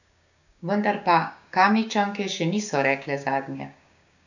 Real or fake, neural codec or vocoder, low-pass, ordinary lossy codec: fake; codec, 16 kHz, 6 kbps, DAC; 7.2 kHz; none